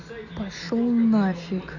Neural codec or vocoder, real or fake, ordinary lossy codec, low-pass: none; real; none; 7.2 kHz